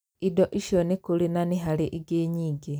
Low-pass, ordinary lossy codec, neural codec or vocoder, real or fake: none; none; none; real